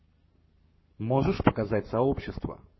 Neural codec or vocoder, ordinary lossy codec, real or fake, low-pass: codec, 44.1 kHz, 7.8 kbps, Pupu-Codec; MP3, 24 kbps; fake; 7.2 kHz